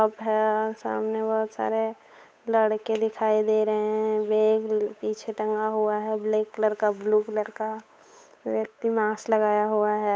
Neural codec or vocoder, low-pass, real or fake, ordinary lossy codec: codec, 16 kHz, 8 kbps, FunCodec, trained on Chinese and English, 25 frames a second; none; fake; none